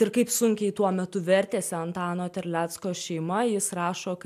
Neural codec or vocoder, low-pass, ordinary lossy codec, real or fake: none; 14.4 kHz; MP3, 96 kbps; real